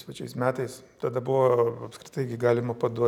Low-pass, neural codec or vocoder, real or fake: 19.8 kHz; none; real